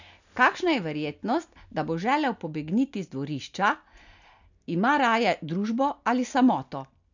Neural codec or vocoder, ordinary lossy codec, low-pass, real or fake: none; none; 7.2 kHz; real